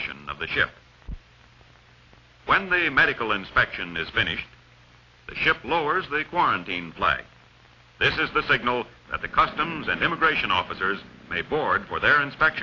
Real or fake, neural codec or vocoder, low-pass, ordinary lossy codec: real; none; 7.2 kHz; AAC, 32 kbps